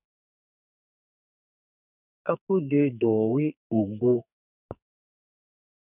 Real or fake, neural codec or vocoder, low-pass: fake; codec, 44.1 kHz, 2.6 kbps, SNAC; 3.6 kHz